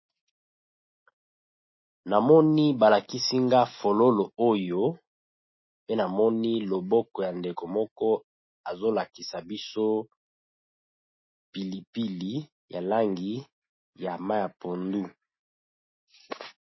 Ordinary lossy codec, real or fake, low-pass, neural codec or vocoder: MP3, 24 kbps; real; 7.2 kHz; none